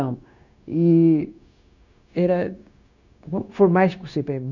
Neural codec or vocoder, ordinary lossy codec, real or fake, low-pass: codec, 16 kHz, 0.9 kbps, LongCat-Audio-Codec; none; fake; 7.2 kHz